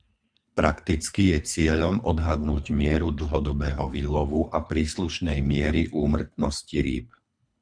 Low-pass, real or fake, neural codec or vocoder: 9.9 kHz; fake; codec, 24 kHz, 3 kbps, HILCodec